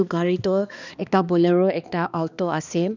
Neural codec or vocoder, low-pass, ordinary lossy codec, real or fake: codec, 16 kHz, 2 kbps, X-Codec, HuBERT features, trained on balanced general audio; 7.2 kHz; none; fake